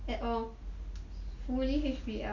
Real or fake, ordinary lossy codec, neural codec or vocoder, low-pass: real; none; none; 7.2 kHz